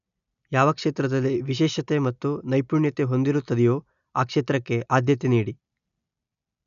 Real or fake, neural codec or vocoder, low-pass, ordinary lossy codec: real; none; 7.2 kHz; none